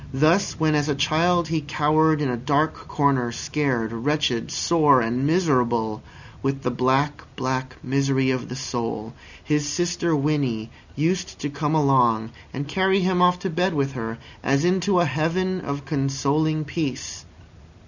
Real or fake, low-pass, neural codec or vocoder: real; 7.2 kHz; none